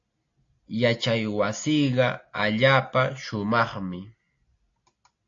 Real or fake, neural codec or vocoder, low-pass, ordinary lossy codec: real; none; 7.2 kHz; AAC, 48 kbps